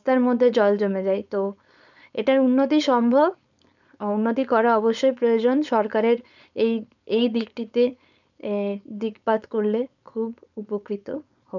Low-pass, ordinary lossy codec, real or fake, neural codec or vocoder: 7.2 kHz; none; fake; codec, 16 kHz, 4.8 kbps, FACodec